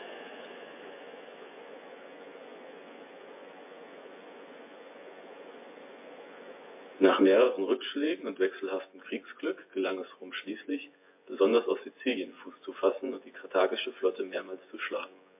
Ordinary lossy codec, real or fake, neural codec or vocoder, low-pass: MP3, 24 kbps; fake; vocoder, 24 kHz, 100 mel bands, Vocos; 3.6 kHz